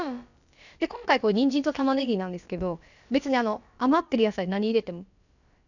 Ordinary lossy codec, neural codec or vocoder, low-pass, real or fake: none; codec, 16 kHz, about 1 kbps, DyCAST, with the encoder's durations; 7.2 kHz; fake